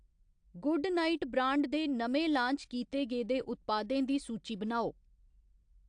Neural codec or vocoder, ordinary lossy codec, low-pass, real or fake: none; AAC, 64 kbps; 9.9 kHz; real